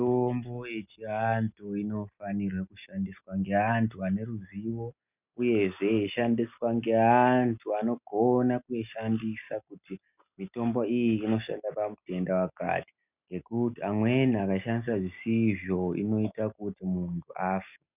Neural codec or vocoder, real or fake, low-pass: none; real; 3.6 kHz